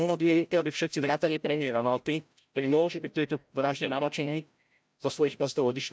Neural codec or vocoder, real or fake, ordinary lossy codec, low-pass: codec, 16 kHz, 0.5 kbps, FreqCodec, larger model; fake; none; none